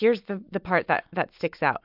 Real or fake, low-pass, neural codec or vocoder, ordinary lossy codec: real; 5.4 kHz; none; MP3, 48 kbps